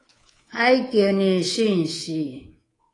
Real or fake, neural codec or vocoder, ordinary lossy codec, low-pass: fake; codec, 24 kHz, 3.1 kbps, DualCodec; AAC, 32 kbps; 10.8 kHz